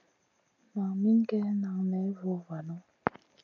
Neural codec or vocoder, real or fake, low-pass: none; real; 7.2 kHz